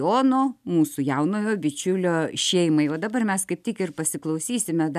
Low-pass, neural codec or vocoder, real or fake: 14.4 kHz; none; real